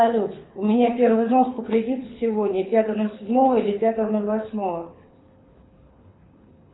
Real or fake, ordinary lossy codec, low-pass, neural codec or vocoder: fake; AAC, 16 kbps; 7.2 kHz; codec, 24 kHz, 6 kbps, HILCodec